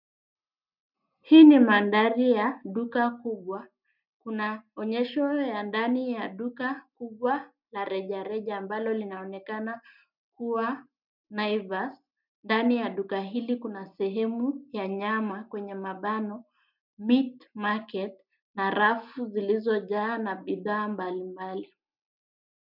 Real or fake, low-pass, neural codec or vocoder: real; 5.4 kHz; none